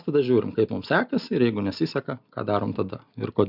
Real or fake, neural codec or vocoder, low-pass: real; none; 5.4 kHz